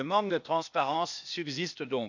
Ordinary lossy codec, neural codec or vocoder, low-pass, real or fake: none; codec, 16 kHz, 0.8 kbps, ZipCodec; 7.2 kHz; fake